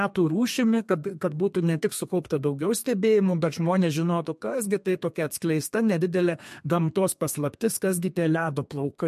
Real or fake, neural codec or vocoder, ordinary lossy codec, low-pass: fake; codec, 32 kHz, 1.9 kbps, SNAC; MP3, 64 kbps; 14.4 kHz